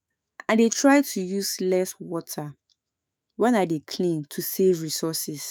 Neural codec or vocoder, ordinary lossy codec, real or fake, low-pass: autoencoder, 48 kHz, 128 numbers a frame, DAC-VAE, trained on Japanese speech; none; fake; none